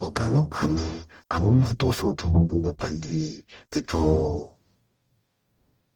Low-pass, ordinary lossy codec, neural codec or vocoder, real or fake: 19.8 kHz; Opus, 24 kbps; codec, 44.1 kHz, 0.9 kbps, DAC; fake